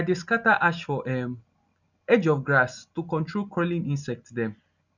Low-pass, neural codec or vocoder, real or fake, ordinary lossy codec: 7.2 kHz; none; real; none